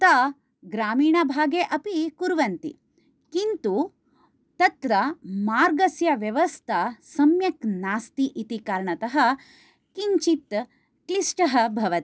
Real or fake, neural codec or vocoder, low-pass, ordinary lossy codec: real; none; none; none